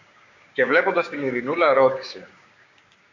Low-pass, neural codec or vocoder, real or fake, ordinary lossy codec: 7.2 kHz; codec, 16 kHz, 6 kbps, DAC; fake; AAC, 48 kbps